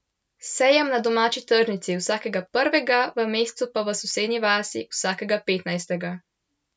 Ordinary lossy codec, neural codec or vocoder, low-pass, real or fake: none; none; none; real